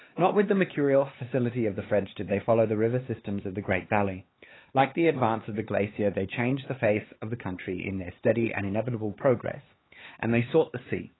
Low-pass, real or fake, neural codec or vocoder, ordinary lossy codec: 7.2 kHz; fake; codec, 16 kHz, 4 kbps, X-Codec, HuBERT features, trained on LibriSpeech; AAC, 16 kbps